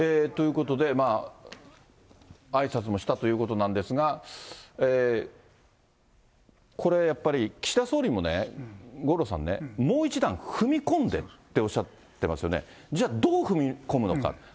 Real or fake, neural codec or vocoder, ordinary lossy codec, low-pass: real; none; none; none